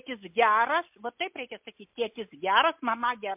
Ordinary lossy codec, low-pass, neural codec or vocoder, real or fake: MP3, 32 kbps; 3.6 kHz; none; real